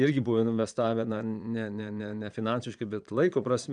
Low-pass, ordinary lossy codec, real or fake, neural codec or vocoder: 9.9 kHz; MP3, 96 kbps; fake; vocoder, 22.05 kHz, 80 mel bands, Vocos